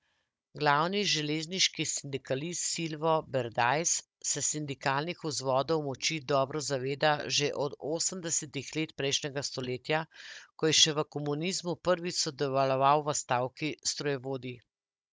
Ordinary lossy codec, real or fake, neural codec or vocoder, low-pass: none; fake; codec, 16 kHz, 16 kbps, FunCodec, trained on Chinese and English, 50 frames a second; none